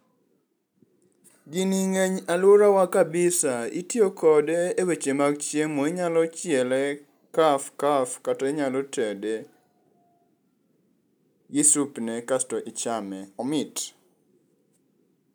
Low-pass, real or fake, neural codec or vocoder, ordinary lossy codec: none; real; none; none